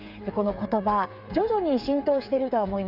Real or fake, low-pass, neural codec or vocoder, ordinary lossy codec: fake; 5.4 kHz; codec, 16 kHz, 8 kbps, FreqCodec, smaller model; Opus, 64 kbps